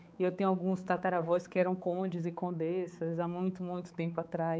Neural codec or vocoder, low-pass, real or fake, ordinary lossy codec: codec, 16 kHz, 4 kbps, X-Codec, HuBERT features, trained on balanced general audio; none; fake; none